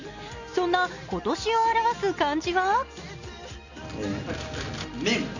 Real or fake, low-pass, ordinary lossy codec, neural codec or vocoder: real; 7.2 kHz; none; none